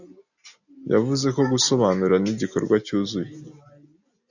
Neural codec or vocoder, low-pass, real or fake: none; 7.2 kHz; real